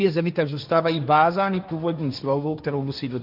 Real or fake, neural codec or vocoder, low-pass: fake; codec, 16 kHz, 1.1 kbps, Voila-Tokenizer; 5.4 kHz